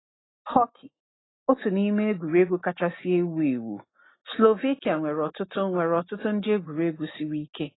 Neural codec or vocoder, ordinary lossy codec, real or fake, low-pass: none; AAC, 16 kbps; real; 7.2 kHz